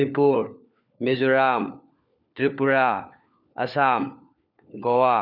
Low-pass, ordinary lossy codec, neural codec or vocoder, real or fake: 5.4 kHz; none; codec, 16 kHz, 4 kbps, FunCodec, trained on LibriTTS, 50 frames a second; fake